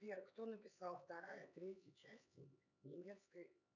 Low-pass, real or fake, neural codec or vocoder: 7.2 kHz; fake; codec, 16 kHz, 4 kbps, X-Codec, HuBERT features, trained on LibriSpeech